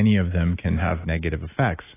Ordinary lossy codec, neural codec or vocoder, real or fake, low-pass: AAC, 16 kbps; none; real; 3.6 kHz